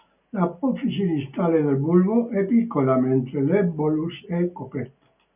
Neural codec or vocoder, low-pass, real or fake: none; 3.6 kHz; real